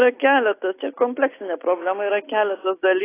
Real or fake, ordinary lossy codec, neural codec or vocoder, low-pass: real; AAC, 16 kbps; none; 3.6 kHz